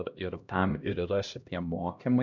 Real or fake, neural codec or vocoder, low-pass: fake; codec, 16 kHz, 1 kbps, X-Codec, HuBERT features, trained on LibriSpeech; 7.2 kHz